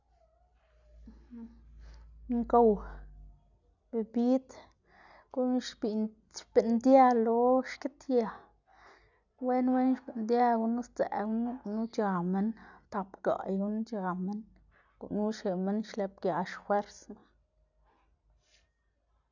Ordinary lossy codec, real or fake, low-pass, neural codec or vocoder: none; real; 7.2 kHz; none